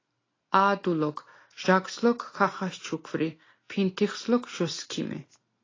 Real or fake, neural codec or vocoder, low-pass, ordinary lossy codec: real; none; 7.2 kHz; AAC, 32 kbps